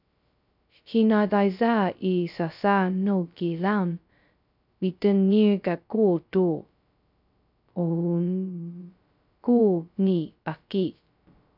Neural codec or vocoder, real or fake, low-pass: codec, 16 kHz, 0.2 kbps, FocalCodec; fake; 5.4 kHz